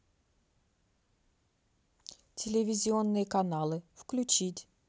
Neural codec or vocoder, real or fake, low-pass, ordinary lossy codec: none; real; none; none